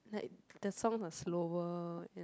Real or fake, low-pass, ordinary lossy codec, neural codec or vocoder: real; none; none; none